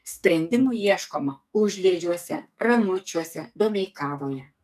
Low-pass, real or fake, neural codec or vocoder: 14.4 kHz; fake; codec, 44.1 kHz, 2.6 kbps, SNAC